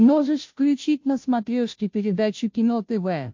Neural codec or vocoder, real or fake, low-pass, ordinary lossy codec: codec, 16 kHz, 0.5 kbps, FunCodec, trained on Chinese and English, 25 frames a second; fake; 7.2 kHz; MP3, 48 kbps